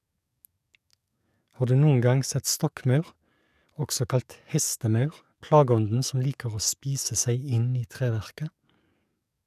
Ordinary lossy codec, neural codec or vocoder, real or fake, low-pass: none; codec, 44.1 kHz, 7.8 kbps, DAC; fake; 14.4 kHz